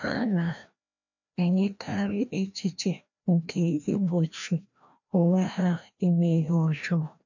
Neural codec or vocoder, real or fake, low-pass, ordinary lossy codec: codec, 16 kHz, 1 kbps, FreqCodec, larger model; fake; 7.2 kHz; none